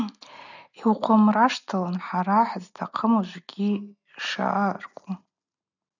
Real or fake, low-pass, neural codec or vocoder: real; 7.2 kHz; none